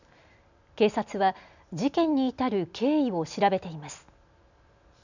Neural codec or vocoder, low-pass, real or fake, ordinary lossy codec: none; 7.2 kHz; real; none